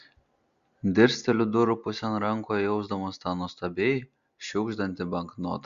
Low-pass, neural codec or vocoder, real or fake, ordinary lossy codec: 7.2 kHz; none; real; Opus, 64 kbps